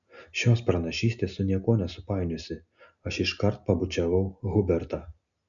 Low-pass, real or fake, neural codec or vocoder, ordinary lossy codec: 7.2 kHz; real; none; MP3, 96 kbps